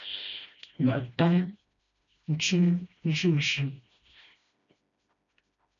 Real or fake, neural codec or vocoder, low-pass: fake; codec, 16 kHz, 1 kbps, FreqCodec, smaller model; 7.2 kHz